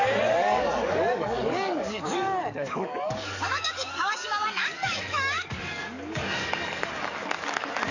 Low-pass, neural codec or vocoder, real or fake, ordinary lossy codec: 7.2 kHz; codec, 44.1 kHz, 7.8 kbps, DAC; fake; none